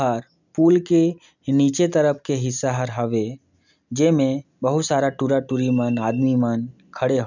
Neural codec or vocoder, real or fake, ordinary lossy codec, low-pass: none; real; none; 7.2 kHz